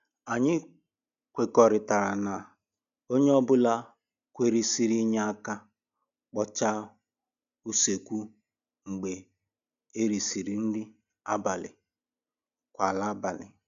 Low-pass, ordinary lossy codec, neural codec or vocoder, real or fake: 7.2 kHz; none; none; real